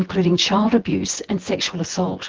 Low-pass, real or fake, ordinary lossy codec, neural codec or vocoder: 7.2 kHz; fake; Opus, 16 kbps; vocoder, 24 kHz, 100 mel bands, Vocos